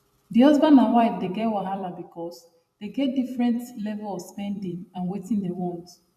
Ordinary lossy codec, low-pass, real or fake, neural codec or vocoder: none; 14.4 kHz; fake; vocoder, 44.1 kHz, 128 mel bands every 256 samples, BigVGAN v2